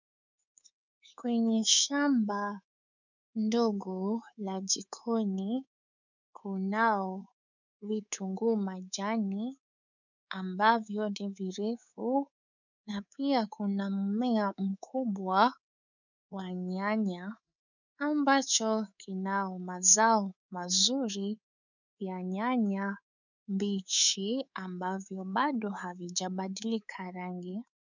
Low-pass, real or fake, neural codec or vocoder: 7.2 kHz; fake; codec, 24 kHz, 3.1 kbps, DualCodec